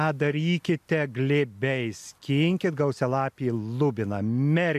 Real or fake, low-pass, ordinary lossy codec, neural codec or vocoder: real; 14.4 kHz; AAC, 96 kbps; none